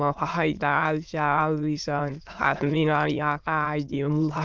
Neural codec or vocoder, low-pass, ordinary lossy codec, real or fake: autoencoder, 22.05 kHz, a latent of 192 numbers a frame, VITS, trained on many speakers; 7.2 kHz; Opus, 32 kbps; fake